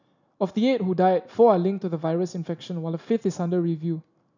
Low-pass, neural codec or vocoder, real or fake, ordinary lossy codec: 7.2 kHz; none; real; none